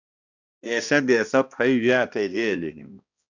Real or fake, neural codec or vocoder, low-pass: fake; codec, 16 kHz, 1 kbps, X-Codec, HuBERT features, trained on balanced general audio; 7.2 kHz